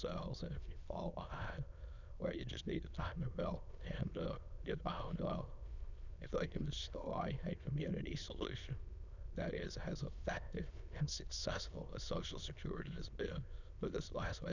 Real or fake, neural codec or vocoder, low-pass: fake; autoencoder, 22.05 kHz, a latent of 192 numbers a frame, VITS, trained on many speakers; 7.2 kHz